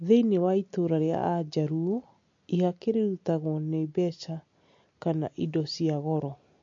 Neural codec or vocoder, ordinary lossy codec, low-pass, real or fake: none; MP3, 48 kbps; 7.2 kHz; real